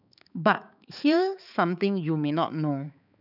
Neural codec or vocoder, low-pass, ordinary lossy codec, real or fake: codec, 16 kHz, 4 kbps, X-Codec, HuBERT features, trained on balanced general audio; 5.4 kHz; none; fake